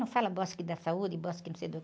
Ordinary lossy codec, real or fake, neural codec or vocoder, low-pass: none; real; none; none